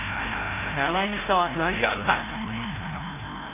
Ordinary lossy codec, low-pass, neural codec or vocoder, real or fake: AAC, 16 kbps; 3.6 kHz; codec, 16 kHz, 0.5 kbps, FreqCodec, larger model; fake